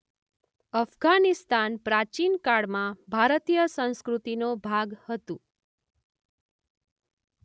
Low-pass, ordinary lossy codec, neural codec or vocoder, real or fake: none; none; none; real